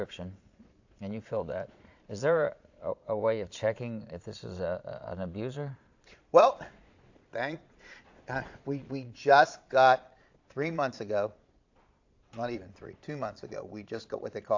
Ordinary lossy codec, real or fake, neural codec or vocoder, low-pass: AAC, 48 kbps; fake; vocoder, 22.05 kHz, 80 mel bands, Vocos; 7.2 kHz